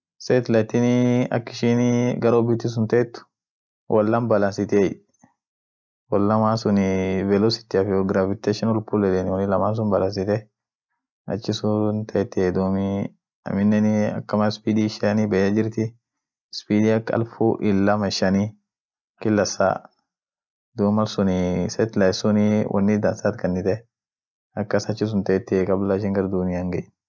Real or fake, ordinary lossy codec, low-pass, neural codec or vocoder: real; none; none; none